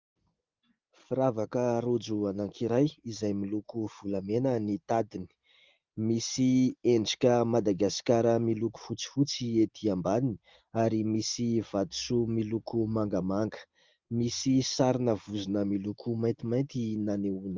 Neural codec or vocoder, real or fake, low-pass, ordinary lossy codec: none; real; 7.2 kHz; Opus, 32 kbps